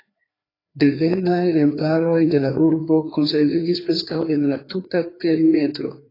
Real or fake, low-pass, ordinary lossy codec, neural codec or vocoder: fake; 5.4 kHz; AAC, 24 kbps; codec, 16 kHz, 2 kbps, FreqCodec, larger model